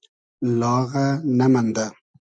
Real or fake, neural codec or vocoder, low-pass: real; none; 9.9 kHz